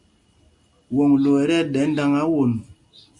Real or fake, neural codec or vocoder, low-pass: real; none; 10.8 kHz